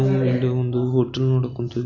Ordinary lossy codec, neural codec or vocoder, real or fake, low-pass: none; none; real; 7.2 kHz